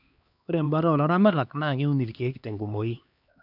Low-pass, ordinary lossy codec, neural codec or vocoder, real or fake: 5.4 kHz; none; codec, 16 kHz, 2 kbps, X-Codec, HuBERT features, trained on LibriSpeech; fake